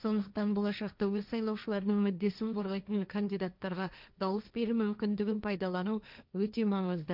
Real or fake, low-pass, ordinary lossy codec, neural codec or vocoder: fake; 5.4 kHz; none; codec, 16 kHz, 1.1 kbps, Voila-Tokenizer